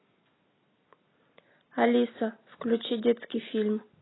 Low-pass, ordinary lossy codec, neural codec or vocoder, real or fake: 7.2 kHz; AAC, 16 kbps; none; real